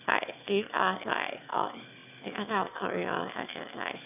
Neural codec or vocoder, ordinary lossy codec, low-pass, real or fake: autoencoder, 22.05 kHz, a latent of 192 numbers a frame, VITS, trained on one speaker; none; 3.6 kHz; fake